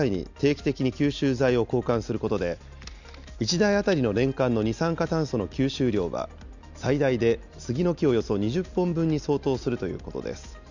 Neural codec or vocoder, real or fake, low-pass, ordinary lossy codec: none; real; 7.2 kHz; none